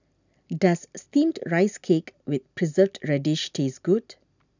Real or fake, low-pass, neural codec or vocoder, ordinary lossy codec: real; 7.2 kHz; none; none